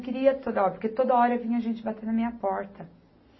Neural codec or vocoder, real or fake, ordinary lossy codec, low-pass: none; real; MP3, 24 kbps; 7.2 kHz